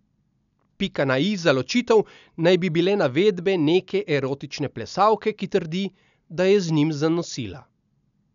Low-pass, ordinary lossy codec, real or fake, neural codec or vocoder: 7.2 kHz; none; real; none